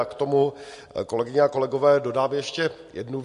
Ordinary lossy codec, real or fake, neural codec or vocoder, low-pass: MP3, 48 kbps; real; none; 14.4 kHz